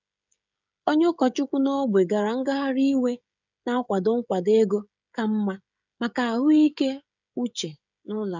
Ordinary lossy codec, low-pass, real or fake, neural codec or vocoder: none; 7.2 kHz; fake; codec, 16 kHz, 16 kbps, FreqCodec, smaller model